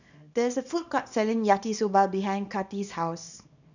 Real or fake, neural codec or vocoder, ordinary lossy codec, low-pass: fake; codec, 24 kHz, 0.9 kbps, WavTokenizer, small release; none; 7.2 kHz